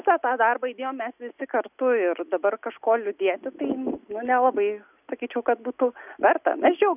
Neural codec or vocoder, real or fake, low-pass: none; real; 3.6 kHz